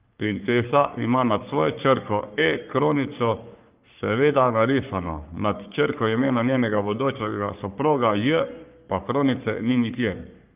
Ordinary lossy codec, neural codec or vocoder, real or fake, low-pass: Opus, 32 kbps; codec, 44.1 kHz, 3.4 kbps, Pupu-Codec; fake; 3.6 kHz